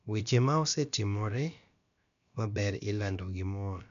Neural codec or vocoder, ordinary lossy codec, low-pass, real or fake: codec, 16 kHz, about 1 kbps, DyCAST, with the encoder's durations; none; 7.2 kHz; fake